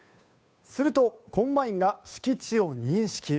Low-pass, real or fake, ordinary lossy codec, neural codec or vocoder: none; fake; none; codec, 16 kHz, 2 kbps, FunCodec, trained on Chinese and English, 25 frames a second